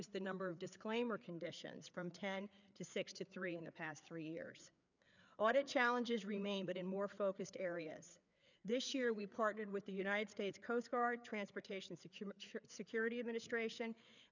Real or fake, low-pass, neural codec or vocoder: fake; 7.2 kHz; codec, 16 kHz, 4 kbps, FreqCodec, larger model